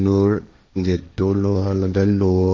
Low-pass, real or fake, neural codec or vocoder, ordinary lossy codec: none; fake; codec, 16 kHz, 1.1 kbps, Voila-Tokenizer; none